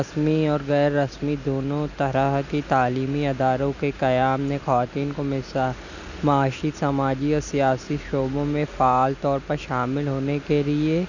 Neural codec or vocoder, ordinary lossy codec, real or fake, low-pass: none; none; real; 7.2 kHz